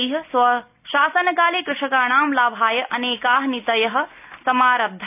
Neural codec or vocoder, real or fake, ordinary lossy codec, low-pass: none; real; none; 3.6 kHz